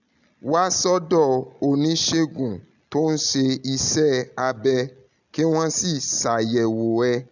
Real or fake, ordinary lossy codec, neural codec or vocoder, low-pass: real; none; none; 7.2 kHz